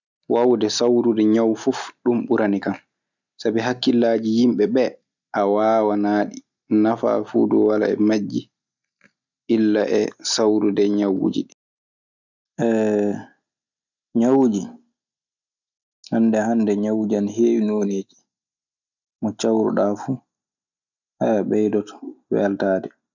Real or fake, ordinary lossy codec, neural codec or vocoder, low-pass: real; none; none; 7.2 kHz